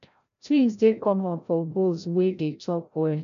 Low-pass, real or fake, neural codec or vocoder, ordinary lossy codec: 7.2 kHz; fake; codec, 16 kHz, 0.5 kbps, FreqCodec, larger model; none